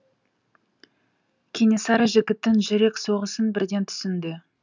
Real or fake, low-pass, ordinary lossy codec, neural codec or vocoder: real; 7.2 kHz; none; none